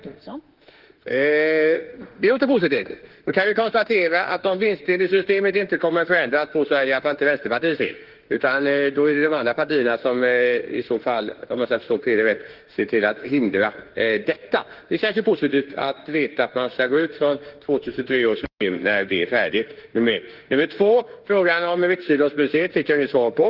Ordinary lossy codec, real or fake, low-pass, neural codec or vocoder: Opus, 16 kbps; fake; 5.4 kHz; autoencoder, 48 kHz, 32 numbers a frame, DAC-VAE, trained on Japanese speech